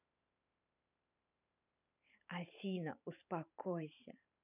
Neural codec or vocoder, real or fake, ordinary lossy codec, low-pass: vocoder, 44.1 kHz, 128 mel bands every 512 samples, BigVGAN v2; fake; none; 3.6 kHz